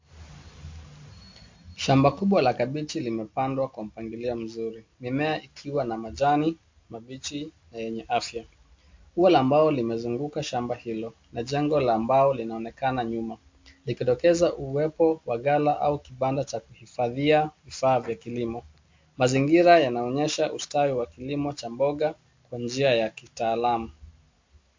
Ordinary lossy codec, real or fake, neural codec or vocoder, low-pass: MP3, 48 kbps; real; none; 7.2 kHz